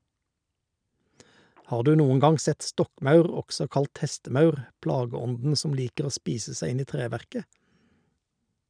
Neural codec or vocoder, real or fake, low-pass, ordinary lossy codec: none; real; 9.9 kHz; none